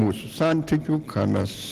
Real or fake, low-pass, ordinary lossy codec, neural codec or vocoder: real; 14.4 kHz; Opus, 16 kbps; none